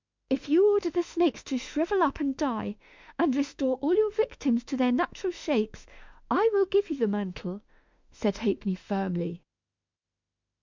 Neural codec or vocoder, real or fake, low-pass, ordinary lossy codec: autoencoder, 48 kHz, 32 numbers a frame, DAC-VAE, trained on Japanese speech; fake; 7.2 kHz; MP3, 64 kbps